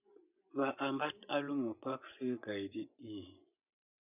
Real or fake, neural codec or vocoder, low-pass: fake; vocoder, 24 kHz, 100 mel bands, Vocos; 3.6 kHz